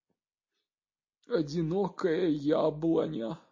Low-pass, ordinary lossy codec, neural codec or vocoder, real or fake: 7.2 kHz; MP3, 32 kbps; none; real